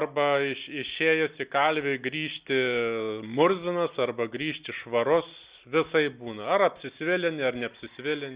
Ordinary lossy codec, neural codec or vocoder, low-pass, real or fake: Opus, 64 kbps; none; 3.6 kHz; real